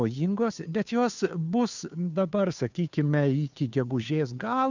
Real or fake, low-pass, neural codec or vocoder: fake; 7.2 kHz; codec, 16 kHz, 2 kbps, FunCodec, trained on Chinese and English, 25 frames a second